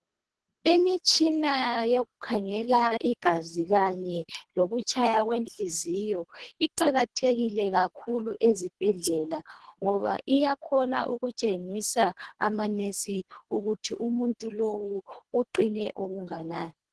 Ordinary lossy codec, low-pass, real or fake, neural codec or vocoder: Opus, 16 kbps; 10.8 kHz; fake; codec, 24 kHz, 1.5 kbps, HILCodec